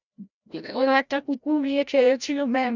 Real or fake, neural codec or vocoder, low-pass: fake; codec, 16 kHz, 0.5 kbps, FreqCodec, larger model; 7.2 kHz